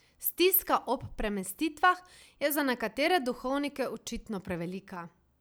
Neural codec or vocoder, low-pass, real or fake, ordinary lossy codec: none; none; real; none